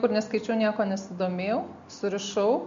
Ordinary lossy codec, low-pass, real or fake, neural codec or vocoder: MP3, 48 kbps; 7.2 kHz; real; none